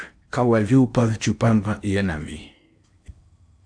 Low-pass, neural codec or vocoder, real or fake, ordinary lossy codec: 9.9 kHz; codec, 16 kHz in and 24 kHz out, 0.8 kbps, FocalCodec, streaming, 65536 codes; fake; AAC, 64 kbps